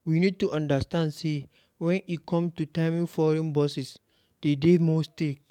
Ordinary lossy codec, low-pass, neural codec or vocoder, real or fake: MP3, 96 kbps; 19.8 kHz; codec, 44.1 kHz, 7.8 kbps, DAC; fake